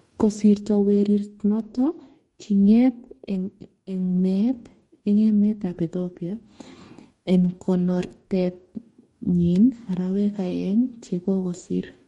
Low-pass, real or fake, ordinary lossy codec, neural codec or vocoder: 19.8 kHz; fake; MP3, 48 kbps; codec, 44.1 kHz, 2.6 kbps, DAC